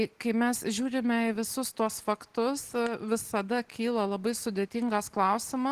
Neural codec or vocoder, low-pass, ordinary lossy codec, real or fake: none; 14.4 kHz; Opus, 16 kbps; real